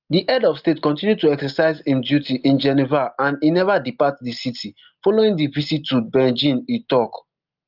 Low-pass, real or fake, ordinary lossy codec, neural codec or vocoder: 5.4 kHz; real; Opus, 24 kbps; none